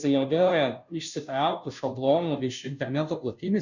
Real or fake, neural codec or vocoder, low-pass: fake; codec, 16 kHz, 0.5 kbps, FunCodec, trained on Chinese and English, 25 frames a second; 7.2 kHz